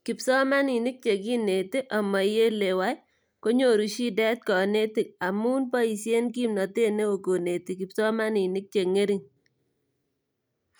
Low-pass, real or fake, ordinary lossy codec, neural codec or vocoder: none; real; none; none